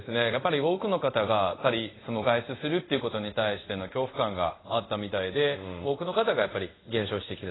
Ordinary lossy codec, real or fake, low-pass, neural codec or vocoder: AAC, 16 kbps; fake; 7.2 kHz; codec, 24 kHz, 0.5 kbps, DualCodec